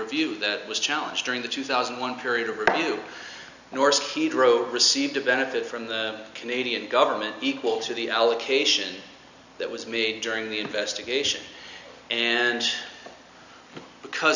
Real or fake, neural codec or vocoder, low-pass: real; none; 7.2 kHz